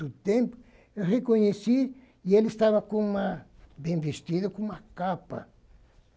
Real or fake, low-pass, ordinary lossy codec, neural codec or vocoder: real; none; none; none